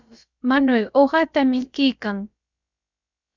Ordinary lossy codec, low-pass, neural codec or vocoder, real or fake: Opus, 64 kbps; 7.2 kHz; codec, 16 kHz, about 1 kbps, DyCAST, with the encoder's durations; fake